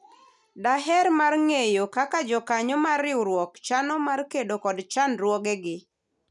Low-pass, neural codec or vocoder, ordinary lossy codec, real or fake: 10.8 kHz; none; none; real